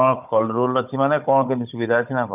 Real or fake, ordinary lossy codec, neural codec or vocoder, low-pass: fake; none; codec, 16 kHz, 16 kbps, FunCodec, trained on Chinese and English, 50 frames a second; 3.6 kHz